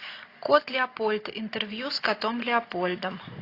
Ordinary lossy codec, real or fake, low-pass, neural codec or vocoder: AAC, 32 kbps; real; 5.4 kHz; none